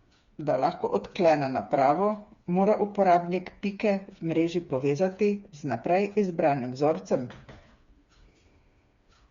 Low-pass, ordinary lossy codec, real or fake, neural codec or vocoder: 7.2 kHz; Opus, 64 kbps; fake; codec, 16 kHz, 4 kbps, FreqCodec, smaller model